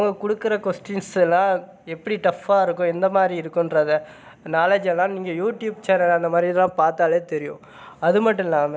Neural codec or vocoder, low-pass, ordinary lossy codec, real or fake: none; none; none; real